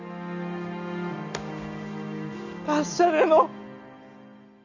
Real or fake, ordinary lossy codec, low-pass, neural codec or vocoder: real; none; 7.2 kHz; none